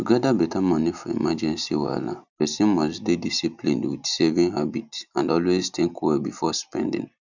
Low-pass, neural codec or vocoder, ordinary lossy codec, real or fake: 7.2 kHz; none; none; real